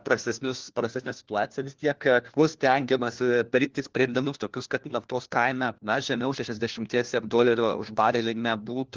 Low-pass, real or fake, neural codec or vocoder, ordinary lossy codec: 7.2 kHz; fake; codec, 16 kHz, 1 kbps, FunCodec, trained on LibriTTS, 50 frames a second; Opus, 16 kbps